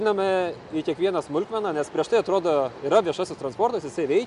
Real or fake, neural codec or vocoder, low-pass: real; none; 10.8 kHz